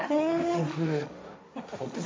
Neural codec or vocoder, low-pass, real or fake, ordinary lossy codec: codec, 16 kHz, 1.1 kbps, Voila-Tokenizer; none; fake; none